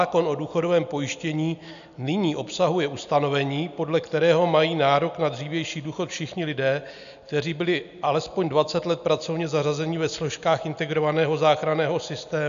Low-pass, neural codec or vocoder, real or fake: 7.2 kHz; none; real